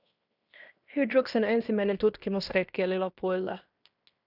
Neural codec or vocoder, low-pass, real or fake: codec, 16 kHz in and 24 kHz out, 0.9 kbps, LongCat-Audio-Codec, fine tuned four codebook decoder; 5.4 kHz; fake